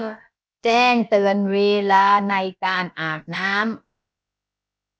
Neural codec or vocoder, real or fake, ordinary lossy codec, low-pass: codec, 16 kHz, about 1 kbps, DyCAST, with the encoder's durations; fake; none; none